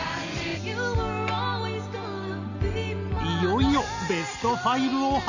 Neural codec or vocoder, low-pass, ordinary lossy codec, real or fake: none; 7.2 kHz; none; real